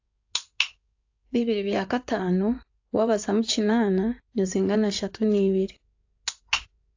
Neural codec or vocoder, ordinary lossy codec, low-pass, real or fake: codec, 16 kHz in and 24 kHz out, 2.2 kbps, FireRedTTS-2 codec; AAC, 48 kbps; 7.2 kHz; fake